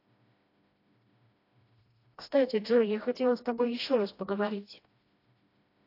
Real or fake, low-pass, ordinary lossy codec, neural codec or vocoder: fake; 5.4 kHz; AAC, 32 kbps; codec, 16 kHz, 1 kbps, FreqCodec, smaller model